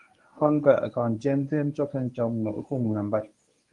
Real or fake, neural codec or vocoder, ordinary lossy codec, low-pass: fake; codec, 24 kHz, 0.9 kbps, WavTokenizer, medium speech release version 1; Opus, 32 kbps; 10.8 kHz